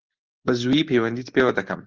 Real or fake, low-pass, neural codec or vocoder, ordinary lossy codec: real; 7.2 kHz; none; Opus, 16 kbps